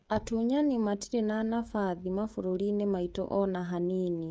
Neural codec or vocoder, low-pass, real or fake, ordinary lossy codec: codec, 16 kHz, 16 kbps, FreqCodec, smaller model; none; fake; none